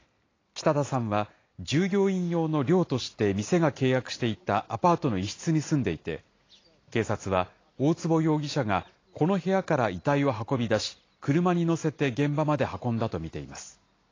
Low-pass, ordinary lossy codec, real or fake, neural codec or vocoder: 7.2 kHz; AAC, 32 kbps; real; none